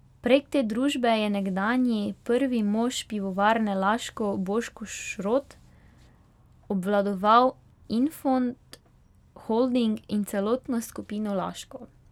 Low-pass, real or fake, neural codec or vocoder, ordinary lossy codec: 19.8 kHz; real; none; none